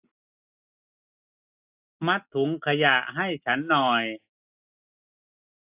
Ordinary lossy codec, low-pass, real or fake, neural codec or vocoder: AAC, 32 kbps; 3.6 kHz; real; none